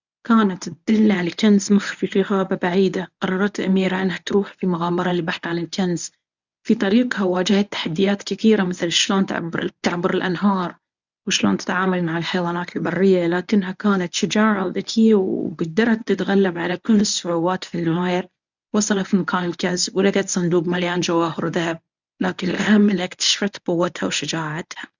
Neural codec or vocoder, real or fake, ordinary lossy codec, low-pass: codec, 24 kHz, 0.9 kbps, WavTokenizer, medium speech release version 1; fake; none; 7.2 kHz